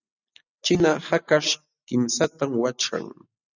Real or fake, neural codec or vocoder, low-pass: real; none; 7.2 kHz